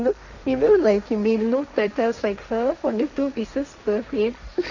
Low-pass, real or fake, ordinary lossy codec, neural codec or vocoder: 7.2 kHz; fake; none; codec, 16 kHz, 1.1 kbps, Voila-Tokenizer